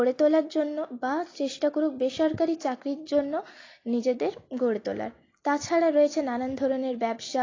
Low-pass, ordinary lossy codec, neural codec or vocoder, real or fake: 7.2 kHz; AAC, 32 kbps; none; real